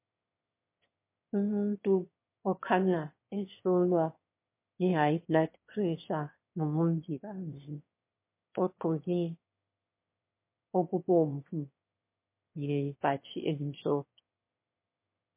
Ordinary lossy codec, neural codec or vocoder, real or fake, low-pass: MP3, 24 kbps; autoencoder, 22.05 kHz, a latent of 192 numbers a frame, VITS, trained on one speaker; fake; 3.6 kHz